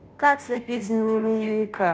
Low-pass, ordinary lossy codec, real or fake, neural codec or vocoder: none; none; fake; codec, 16 kHz, 0.5 kbps, FunCodec, trained on Chinese and English, 25 frames a second